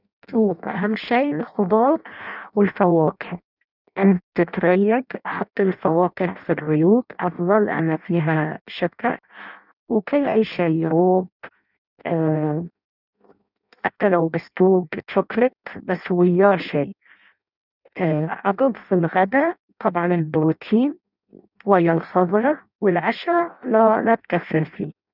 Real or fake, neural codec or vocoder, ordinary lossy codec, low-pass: fake; codec, 16 kHz in and 24 kHz out, 0.6 kbps, FireRedTTS-2 codec; none; 5.4 kHz